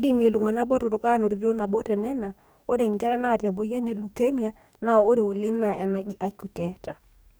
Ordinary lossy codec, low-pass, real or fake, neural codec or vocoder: none; none; fake; codec, 44.1 kHz, 2.6 kbps, DAC